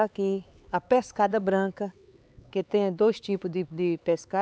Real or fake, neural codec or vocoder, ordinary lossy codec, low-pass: fake; codec, 16 kHz, 4 kbps, X-Codec, HuBERT features, trained on LibriSpeech; none; none